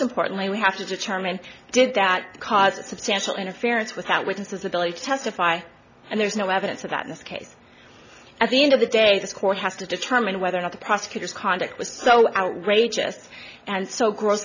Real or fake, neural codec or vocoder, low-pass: real; none; 7.2 kHz